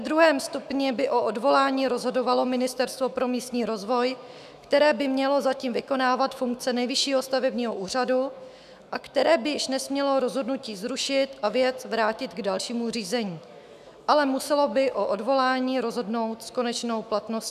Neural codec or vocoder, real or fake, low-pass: autoencoder, 48 kHz, 128 numbers a frame, DAC-VAE, trained on Japanese speech; fake; 14.4 kHz